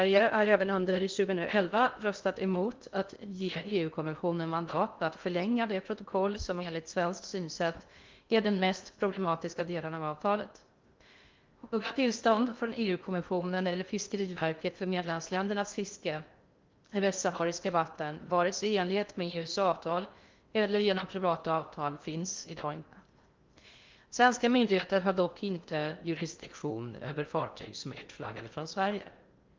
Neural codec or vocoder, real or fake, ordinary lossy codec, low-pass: codec, 16 kHz in and 24 kHz out, 0.6 kbps, FocalCodec, streaming, 2048 codes; fake; Opus, 24 kbps; 7.2 kHz